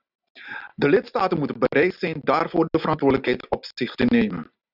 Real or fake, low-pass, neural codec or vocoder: real; 5.4 kHz; none